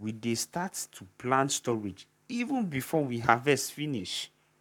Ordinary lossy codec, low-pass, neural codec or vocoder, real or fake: none; 19.8 kHz; codec, 44.1 kHz, 7.8 kbps, Pupu-Codec; fake